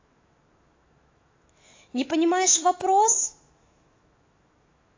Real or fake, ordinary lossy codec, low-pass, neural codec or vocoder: fake; AAC, 32 kbps; 7.2 kHz; autoencoder, 48 kHz, 128 numbers a frame, DAC-VAE, trained on Japanese speech